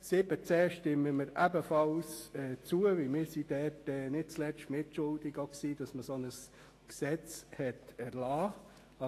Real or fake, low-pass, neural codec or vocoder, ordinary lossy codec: fake; 14.4 kHz; autoencoder, 48 kHz, 128 numbers a frame, DAC-VAE, trained on Japanese speech; AAC, 48 kbps